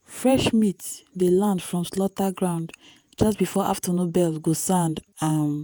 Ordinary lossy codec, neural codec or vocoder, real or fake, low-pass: none; vocoder, 48 kHz, 128 mel bands, Vocos; fake; none